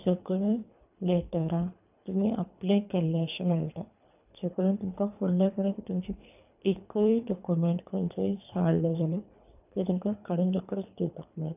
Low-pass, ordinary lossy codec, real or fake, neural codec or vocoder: 3.6 kHz; none; fake; codec, 24 kHz, 3 kbps, HILCodec